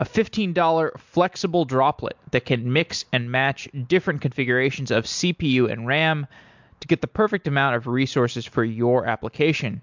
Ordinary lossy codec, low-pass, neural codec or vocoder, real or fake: MP3, 64 kbps; 7.2 kHz; none; real